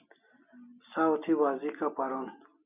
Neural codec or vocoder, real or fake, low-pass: none; real; 3.6 kHz